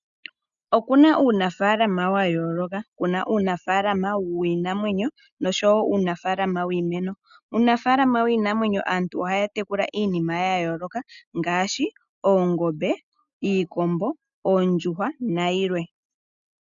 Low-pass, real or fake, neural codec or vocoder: 7.2 kHz; real; none